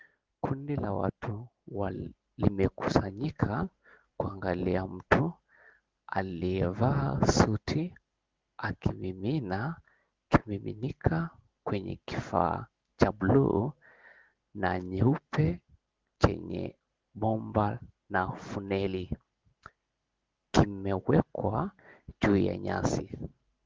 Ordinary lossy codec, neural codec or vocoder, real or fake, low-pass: Opus, 16 kbps; none; real; 7.2 kHz